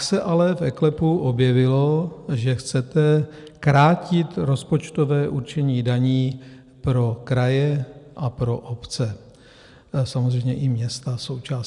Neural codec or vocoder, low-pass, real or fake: none; 10.8 kHz; real